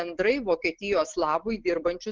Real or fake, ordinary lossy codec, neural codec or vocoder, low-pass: real; Opus, 16 kbps; none; 7.2 kHz